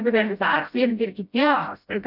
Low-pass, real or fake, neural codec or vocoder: 5.4 kHz; fake; codec, 16 kHz, 0.5 kbps, FreqCodec, smaller model